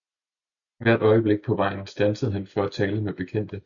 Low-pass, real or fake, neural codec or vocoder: 7.2 kHz; real; none